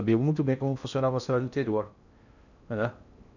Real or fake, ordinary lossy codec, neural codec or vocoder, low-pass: fake; none; codec, 16 kHz in and 24 kHz out, 0.8 kbps, FocalCodec, streaming, 65536 codes; 7.2 kHz